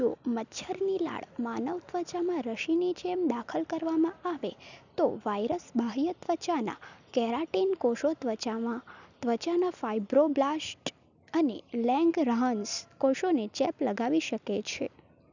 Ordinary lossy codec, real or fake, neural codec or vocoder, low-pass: none; real; none; 7.2 kHz